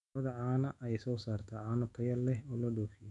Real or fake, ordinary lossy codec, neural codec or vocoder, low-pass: fake; none; autoencoder, 48 kHz, 128 numbers a frame, DAC-VAE, trained on Japanese speech; 10.8 kHz